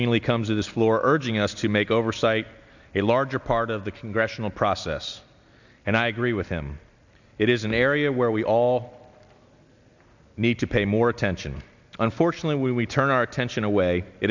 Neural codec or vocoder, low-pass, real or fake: none; 7.2 kHz; real